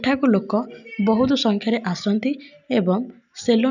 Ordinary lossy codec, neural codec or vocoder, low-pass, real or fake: none; none; 7.2 kHz; real